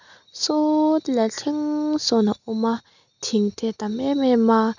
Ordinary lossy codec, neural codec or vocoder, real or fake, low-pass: none; none; real; 7.2 kHz